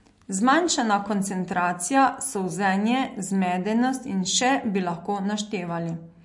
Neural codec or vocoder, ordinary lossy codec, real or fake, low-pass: none; MP3, 48 kbps; real; 10.8 kHz